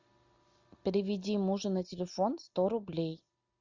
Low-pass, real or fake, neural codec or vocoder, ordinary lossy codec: 7.2 kHz; real; none; Opus, 64 kbps